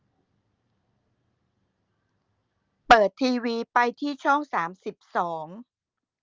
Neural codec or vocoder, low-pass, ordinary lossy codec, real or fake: none; 7.2 kHz; Opus, 32 kbps; real